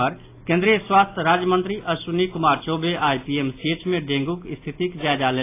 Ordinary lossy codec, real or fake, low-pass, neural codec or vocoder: AAC, 24 kbps; real; 3.6 kHz; none